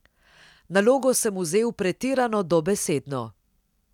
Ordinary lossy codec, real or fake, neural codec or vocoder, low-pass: none; real; none; 19.8 kHz